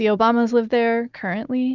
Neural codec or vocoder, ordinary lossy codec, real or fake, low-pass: none; Opus, 64 kbps; real; 7.2 kHz